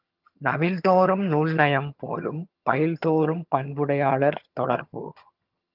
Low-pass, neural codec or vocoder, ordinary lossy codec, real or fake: 5.4 kHz; vocoder, 22.05 kHz, 80 mel bands, HiFi-GAN; Opus, 24 kbps; fake